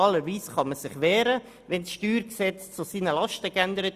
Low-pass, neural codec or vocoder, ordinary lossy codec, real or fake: 14.4 kHz; none; Opus, 64 kbps; real